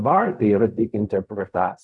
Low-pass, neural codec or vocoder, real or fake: 10.8 kHz; codec, 16 kHz in and 24 kHz out, 0.4 kbps, LongCat-Audio-Codec, fine tuned four codebook decoder; fake